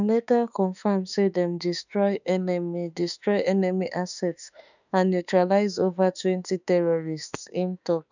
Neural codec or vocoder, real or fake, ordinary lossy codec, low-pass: autoencoder, 48 kHz, 32 numbers a frame, DAC-VAE, trained on Japanese speech; fake; none; 7.2 kHz